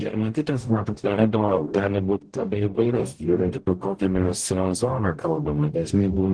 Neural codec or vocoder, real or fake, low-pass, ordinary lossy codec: codec, 44.1 kHz, 0.9 kbps, DAC; fake; 9.9 kHz; Opus, 16 kbps